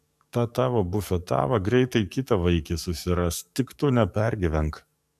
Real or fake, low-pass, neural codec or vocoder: fake; 14.4 kHz; codec, 44.1 kHz, 7.8 kbps, DAC